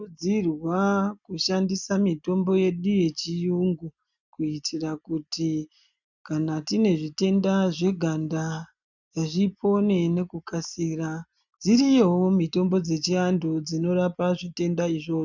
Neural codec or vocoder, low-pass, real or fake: none; 7.2 kHz; real